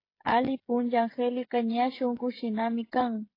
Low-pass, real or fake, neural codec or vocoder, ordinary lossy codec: 5.4 kHz; fake; codec, 16 kHz, 16 kbps, FreqCodec, smaller model; AAC, 24 kbps